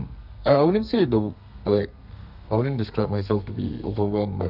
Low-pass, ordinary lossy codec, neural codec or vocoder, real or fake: 5.4 kHz; none; codec, 32 kHz, 1.9 kbps, SNAC; fake